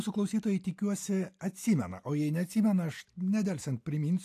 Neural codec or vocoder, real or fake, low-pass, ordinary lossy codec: none; real; 14.4 kHz; AAC, 64 kbps